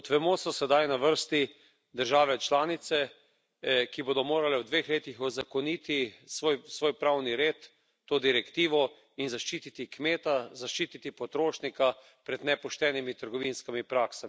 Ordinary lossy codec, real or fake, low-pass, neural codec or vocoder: none; real; none; none